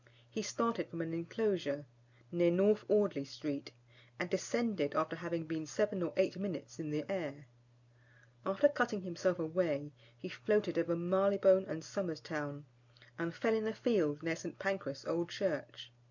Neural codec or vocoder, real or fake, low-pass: none; real; 7.2 kHz